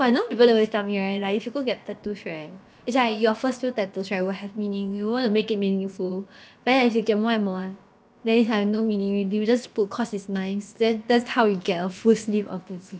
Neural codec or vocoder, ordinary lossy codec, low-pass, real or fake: codec, 16 kHz, about 1 kbps, DyCAST, with the encoder's durations; none; none; fake